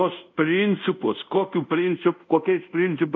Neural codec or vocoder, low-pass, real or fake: codec, 24 kHz, 0.5 kbps, DualCodec; 7.2 kHz; fake